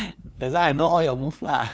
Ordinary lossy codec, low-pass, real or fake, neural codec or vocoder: none; none; fake; codec, 16 kHz, 2 kbps, FunCodec, trained on LibriTTS, 25 frames a second